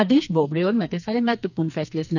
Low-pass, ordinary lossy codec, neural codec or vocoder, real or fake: 7.2 kHz; none; codec, 44.1 kHz, 2.6 kbps, SNAC; fake